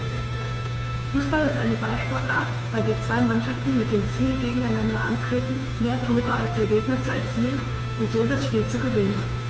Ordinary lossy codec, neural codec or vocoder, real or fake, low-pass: none; codec, 16 kHz, 2 kbps, FunCodec, trained on Chinese and English, 25 frames a second; fake; none